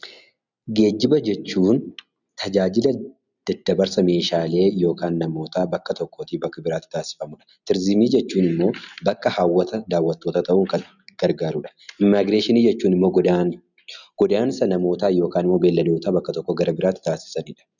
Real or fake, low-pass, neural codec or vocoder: real; 7.2 kHz; none